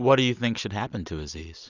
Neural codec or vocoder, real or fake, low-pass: none; real; 7.2 kHz